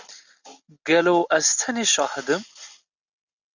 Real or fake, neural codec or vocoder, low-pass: real; none; 7.2 kHz